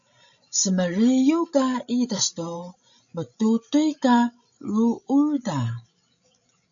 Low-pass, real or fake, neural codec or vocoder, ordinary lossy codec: 7.2 kHz; fake; codec, 16 kHz, 16 kbps, FreqCodec, larger model; MP3, 96 kbps